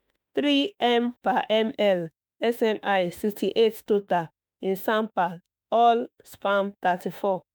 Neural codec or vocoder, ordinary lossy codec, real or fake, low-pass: autoencoder, 48 kHz, 32 numbers a frame, DAC-VAE, trained on Japanese speech; none; fake; none